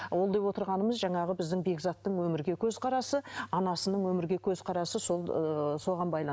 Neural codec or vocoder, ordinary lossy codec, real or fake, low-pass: none; none; real; none